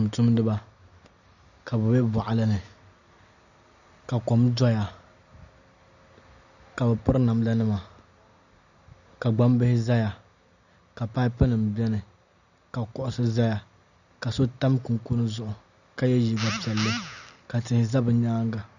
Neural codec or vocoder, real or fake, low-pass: none; real; 7.2 kHz